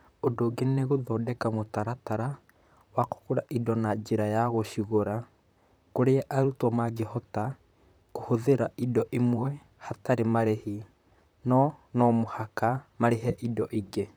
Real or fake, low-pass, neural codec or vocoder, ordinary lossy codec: fake; none; vocoder, 44.1 kHz, 128 mel bands, Pupu-Vocoder; none